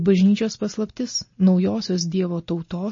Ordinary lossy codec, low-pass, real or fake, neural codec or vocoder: MP3, 32 kbps; 7.2 kHz; real; none